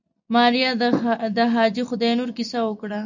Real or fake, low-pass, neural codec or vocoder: real; 7.2 kHz; none